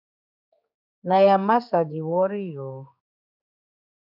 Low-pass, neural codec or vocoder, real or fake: 5.4 kHz; codec, 16 kHz, 4 kbps, X-Codec, HuBERT features, trained on general audio; fake